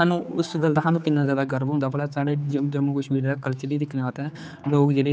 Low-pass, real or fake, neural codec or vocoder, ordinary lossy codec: none; fake; codec, 16 kHz, 4 kbps, X-Codec, HuBERT features, trained on general audio; none